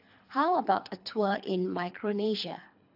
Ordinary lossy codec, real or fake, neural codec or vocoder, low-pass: AAC, 48 kbps; fake; codec, 24 kHz, 3 kbps, HILCodec; 5.4 kHz